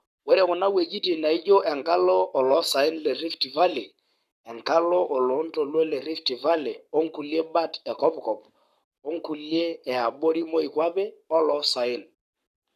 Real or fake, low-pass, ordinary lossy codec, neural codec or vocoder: fake; 14.4 kHz; none; codec, 44.1 kHz, 7.8 kbps, Pupu-Codec